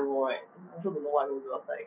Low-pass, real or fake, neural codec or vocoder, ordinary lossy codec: 3.6 kHz; real; none; none